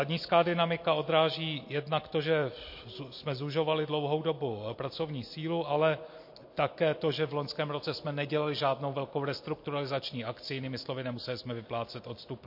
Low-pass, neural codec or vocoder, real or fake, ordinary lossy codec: 5.4 kHz; none; real; MP3, 32 kbps